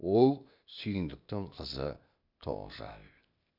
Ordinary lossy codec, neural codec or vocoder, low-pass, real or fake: none; codec, 16 kHz, 0.8 kbps, ZipCodec; 5.4 kHz; fake